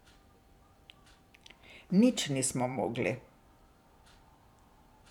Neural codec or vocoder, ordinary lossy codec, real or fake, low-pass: vocoder, 44.1 kHz, 128 mel bands every 512 samples, BigVGAN v2; none; fake; 19.8 kHz